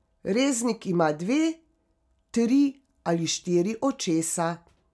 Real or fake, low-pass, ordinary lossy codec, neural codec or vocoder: real; none; none; none